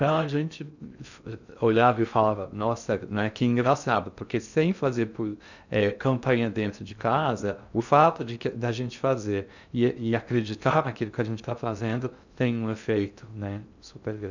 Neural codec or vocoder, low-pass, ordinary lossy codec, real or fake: codec, 16 kHz in and 24 kHz out, 0.6 kbps, FocalCodec, streaming, 2048 codes; 7.2 kHz; none; fake